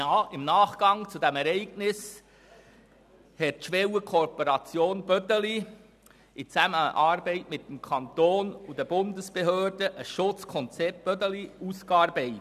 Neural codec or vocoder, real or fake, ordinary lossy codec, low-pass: none; real; none; 14.4 kHz